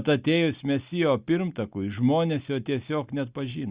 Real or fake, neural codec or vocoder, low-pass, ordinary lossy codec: real; none; 3.6 kHz; Opus, 64 kbps